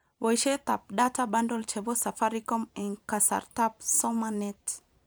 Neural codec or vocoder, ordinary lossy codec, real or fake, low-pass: vocoder, 44.1 kHz, 128 mel bands every 512 samples, BigVGAN v2; none; fake; none